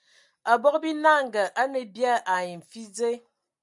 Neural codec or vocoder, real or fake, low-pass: none; real; 10.8 kHz